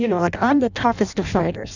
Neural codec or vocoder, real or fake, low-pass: codec, 16 kHz in and 24 kHz out, 0.6 kbps, FireRedTTS-2 codec; fake; 7.2 kHz